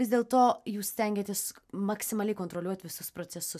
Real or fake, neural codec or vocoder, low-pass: real; none; 14.4 kHz